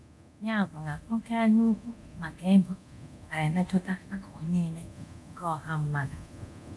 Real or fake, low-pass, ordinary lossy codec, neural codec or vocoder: fake; none; none; codec, 24 kHz, 0.9 kbps, DualCodec